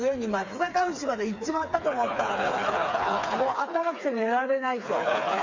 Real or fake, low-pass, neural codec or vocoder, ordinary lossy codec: fake; 7.2 kHz; codec, 16 kHz, 4 kbps, FreqCodec, smaller model; MP3, 48 kbps